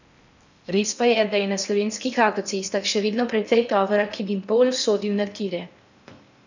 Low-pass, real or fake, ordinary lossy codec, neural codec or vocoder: 7.2 kHz; fake; none; codec, 16 kHz in and 24 kHz out, 0.8 kbps, FocalCodec, streaming, 65536 codes